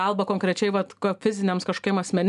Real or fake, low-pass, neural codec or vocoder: real; 10.8 kHz; none